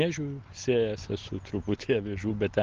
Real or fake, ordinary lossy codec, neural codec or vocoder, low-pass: real; Opus, 16 kbps; none; 7.2 kHz